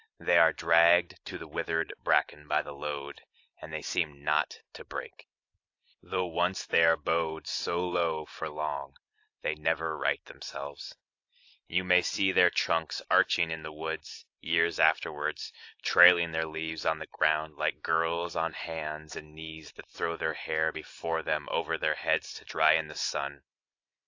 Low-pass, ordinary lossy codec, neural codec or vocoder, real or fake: 7.2 kHz; AAC, 48 kbps; none; real